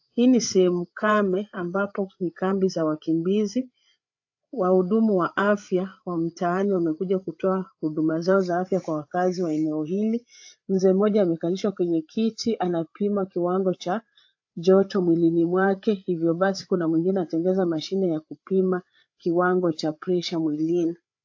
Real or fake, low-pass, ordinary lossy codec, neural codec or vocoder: fake; 7.2 kHz; AAC, 48 kbps; codec, 16 kHz, 8 kbps, FreqCodec, larger model